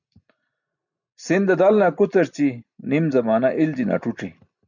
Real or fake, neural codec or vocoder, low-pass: real; none; 7.2 kHz